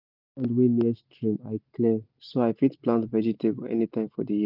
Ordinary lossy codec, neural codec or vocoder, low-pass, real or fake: none; none; 5.4 kHz; real